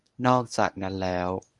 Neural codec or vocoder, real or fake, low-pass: codec, 24 kHz, 0.9 kbps, WavTokenizer, medium speech release version 1; fake; 10.8 kHz